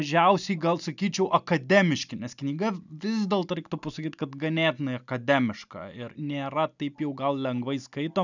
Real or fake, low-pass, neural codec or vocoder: real; 7.2 kHz; none